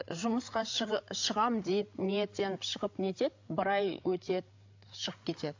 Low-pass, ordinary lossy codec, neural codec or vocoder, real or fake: 7.2 kHz; AAC, 48 kbps; codec, 16 kHz, 8 kbps, FreqCodec, larger model; fake